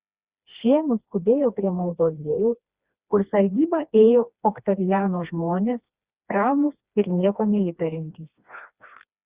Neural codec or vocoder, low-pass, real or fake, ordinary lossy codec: codec, 16 kHz, 2 kbps, FreqCodec, smaller model; 3.6 kHz; fake; Opus, 64 kbps